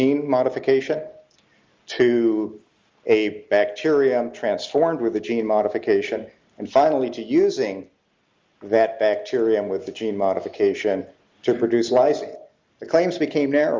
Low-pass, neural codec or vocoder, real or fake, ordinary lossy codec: 7.2 kHz; none; real; Opus, 32 kbps